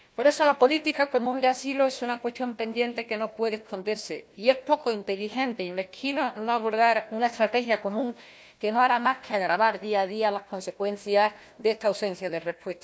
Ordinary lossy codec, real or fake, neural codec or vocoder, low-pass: none; fake; codec, 16 kHz, 1 kbps, FunCodec, trained on Chinese and English, 50 frames a second; none